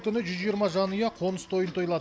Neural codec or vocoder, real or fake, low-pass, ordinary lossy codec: none; real; none; none